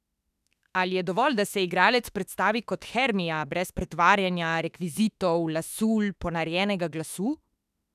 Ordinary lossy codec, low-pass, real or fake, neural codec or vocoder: none; 14.4 kHz; fake; autoencoder, 48 kHz, 32 numbers a frame, DAC-VAE, trained on Japanese speech